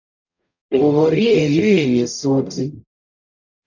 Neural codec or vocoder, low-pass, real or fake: codec, 44.1 kHz, 0.9 kbps, DAC; 7.2 kHz; fake